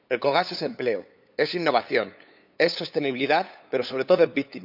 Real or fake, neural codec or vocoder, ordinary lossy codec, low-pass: fake; codec, 16 kHz, 8 kbps, FunCodec, trained on LibriTTS, 25 frames a second; AAC, 48 kbps; 5.4 kHz